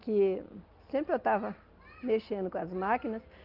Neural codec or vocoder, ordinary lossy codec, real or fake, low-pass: none; Opus, 32 kbps; real; 5.4 kHz